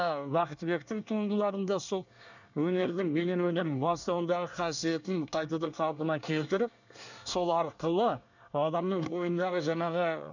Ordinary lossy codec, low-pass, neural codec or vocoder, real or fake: none; 7.2 kHz; codec, 24 kHz, 1 kbps, SNAC; fake